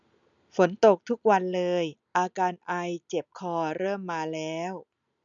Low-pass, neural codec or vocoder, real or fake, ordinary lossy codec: 7.2 kHz; none; real; none